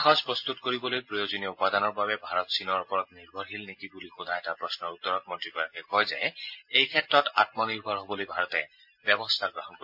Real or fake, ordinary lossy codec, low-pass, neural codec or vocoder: real; MP3, 32 kbps; 5.4 kHz; none